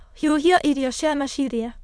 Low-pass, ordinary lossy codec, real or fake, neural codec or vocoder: none; none; fake; autoencoder, 22.05 kHz, a latent of 192 numbers a frame, VITS, trained on many speakers